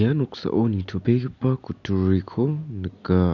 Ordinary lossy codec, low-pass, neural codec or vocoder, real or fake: none; 7.2 kHz; none; real